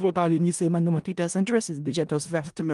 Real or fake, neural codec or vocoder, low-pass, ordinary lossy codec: fake; codec, 16 kHz in and 24 kHz out, 0.4 kbps, LongCat-Audio-Codec, four codebook decoder; 10.8 kHz; Opus, 32 kbps